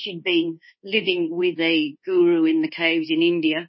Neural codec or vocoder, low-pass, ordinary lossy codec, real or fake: codec, 24 kHz, 1.2 kbps, DualCodec; 7.2 kHz; MP3, 24 kbps; fake